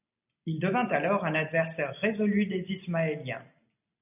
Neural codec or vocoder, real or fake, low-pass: none; real; 3.6 kHz